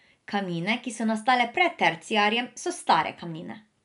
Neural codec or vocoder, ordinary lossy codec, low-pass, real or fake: none; none; 10.8 kHz; real